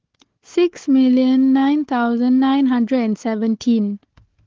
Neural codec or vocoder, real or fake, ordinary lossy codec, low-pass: codec, 16 kHz, 4 kbps, FunCodec, trained on Chinese and English, 50 frames a second; fake; Opus, 16 kbps; 7.2 kHz